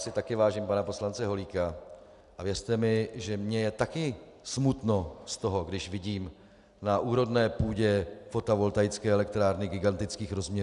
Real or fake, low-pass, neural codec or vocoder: real; 10.8 kHz; none